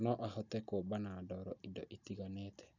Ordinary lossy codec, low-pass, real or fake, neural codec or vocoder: none; 7.2 kHz; real; none